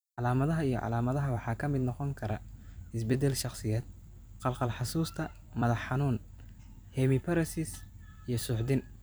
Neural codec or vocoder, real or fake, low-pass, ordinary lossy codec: vocoder, 44.1 kHz, 128 mel bands every 256 samples, BigVGAN v2; fake; none; none